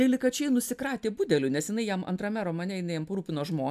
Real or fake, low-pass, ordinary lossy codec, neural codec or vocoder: real; 14.4 kHz; AAC, 96 kbps; none